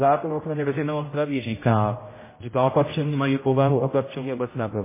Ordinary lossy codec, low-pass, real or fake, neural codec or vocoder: MP3, 16 kbps; 3.6 kHz; fake; codec, 16 kHz, 0.5 kbps, X-Codec, HuBERT features, trained on general audio